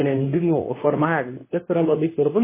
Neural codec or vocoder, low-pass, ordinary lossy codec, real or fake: codec, 24 kHz, 0.9 kbps, WavTokenizer, medium speech release version 2; 3.6 kHz; MP3, 16 kbps; fake